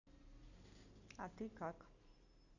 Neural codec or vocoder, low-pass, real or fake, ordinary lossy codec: none; 7.2 kHz; real; none